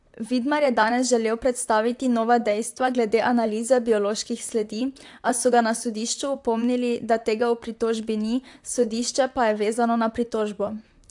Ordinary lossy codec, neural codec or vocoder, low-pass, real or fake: AAC, 64 kbps; vocoder, 44.1 kHz, 128 mel bands, Pupu-Vocoder; 10.8 kHz; fake